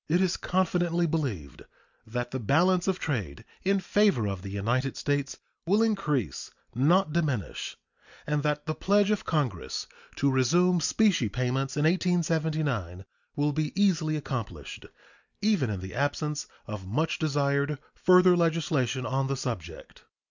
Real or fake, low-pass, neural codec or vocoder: real; 7.2 kHz; none